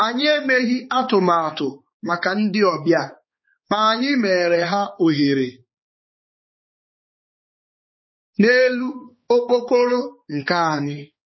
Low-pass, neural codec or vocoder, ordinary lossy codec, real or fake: 7.2 kHz; codec, 16 kHz, 4 kbps, X-Codec, HuBERT features, trained on balanced general audio; MP3, 24 kbps; fake